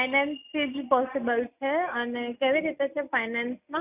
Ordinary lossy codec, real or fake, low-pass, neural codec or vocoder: none; real; 3.6 kHz; none